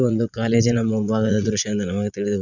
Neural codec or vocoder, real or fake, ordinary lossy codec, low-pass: vocoder, 44.1 kHz, 80 mel bands, Vocos; fake; none; 7.2 kHz